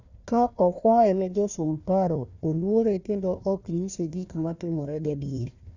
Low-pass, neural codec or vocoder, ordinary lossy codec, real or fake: 7.2 kHz; codec, 16 kHz, 1 kbps, FunCodec, trained on Chinese and English, 50 frames a second; none; fake